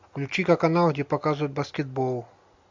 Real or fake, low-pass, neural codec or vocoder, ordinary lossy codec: real; 7.2 kHz; none; MP3, 64 kbps